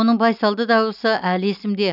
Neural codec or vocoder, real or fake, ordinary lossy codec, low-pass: none; real; none; 5.4 kHz